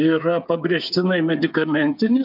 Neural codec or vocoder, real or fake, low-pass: codec, 16 kHz, 8 kbps, FreqCodec, smaller model; fake; 5.4 kHz